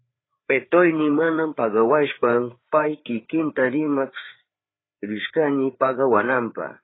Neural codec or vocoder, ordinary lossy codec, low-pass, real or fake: codec, 16 kHz, 4 kbps, FreqCodec, larger model; AAC, 16 kbps; 7.2 kHz; fake